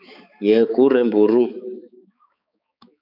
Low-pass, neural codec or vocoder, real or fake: 5.4 kHz; codec, 16 kHz, 4 kbps, X-Codec, HuBERT features, trained on balanced general audio; fake